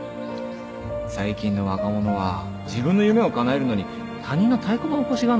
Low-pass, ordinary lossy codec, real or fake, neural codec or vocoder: none; none; real; none